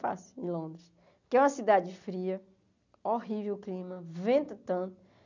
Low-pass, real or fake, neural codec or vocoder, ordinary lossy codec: 7.2 kHz; real; none; none